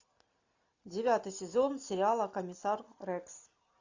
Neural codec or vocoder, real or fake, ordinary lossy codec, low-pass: none; real; AAC, 48 kbps; 7.2 kHz